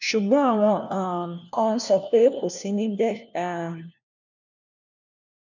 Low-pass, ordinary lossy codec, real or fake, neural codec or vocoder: 7.2 kHz; none; fake; codec, 16 kHz, 1 kbps, FunCodec, trained on LibriTTS, 50 frames a second